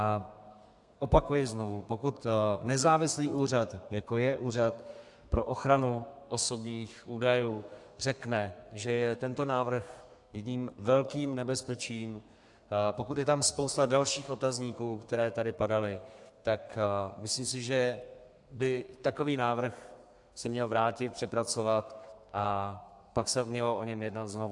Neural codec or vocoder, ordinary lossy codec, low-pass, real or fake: codec, 32 kHz, 1.9 kbps, SNAC; AAC, 64 kbps; 10.8 kHz; fake